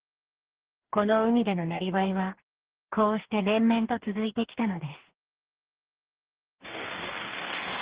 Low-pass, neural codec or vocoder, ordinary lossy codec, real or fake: 3.6 kHz; codec, 44.1 kHz, 2.6 kbps, DAC; Opus, 16 kbps; fake